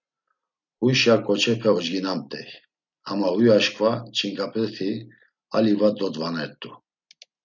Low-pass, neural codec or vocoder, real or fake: 7.2 kHz; none; real